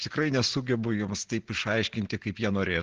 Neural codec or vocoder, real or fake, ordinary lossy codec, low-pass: none; real; Opus, 16 kbps; 7.2 kHz